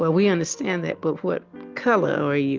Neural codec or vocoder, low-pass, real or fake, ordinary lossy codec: none; 7.2 kHz; real; Opus, 24 kbps